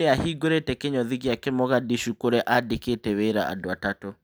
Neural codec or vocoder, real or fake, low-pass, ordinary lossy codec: none; real; none; none